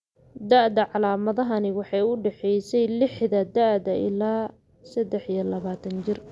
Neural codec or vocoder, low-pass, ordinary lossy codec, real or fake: none; none; none; real